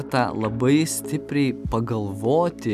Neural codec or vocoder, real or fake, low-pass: none; real; 14.4 kHz